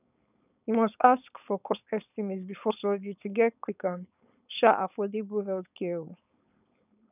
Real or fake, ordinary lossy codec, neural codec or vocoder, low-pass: fake; none; codec, 16 kHz, 4.8 kbps, FACodec; 3.6 kHz